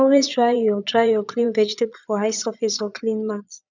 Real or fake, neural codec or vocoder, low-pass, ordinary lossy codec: fake; vocoder, 22.05 kHz, 80 mel bands, Vocos; 7.2 kHz; none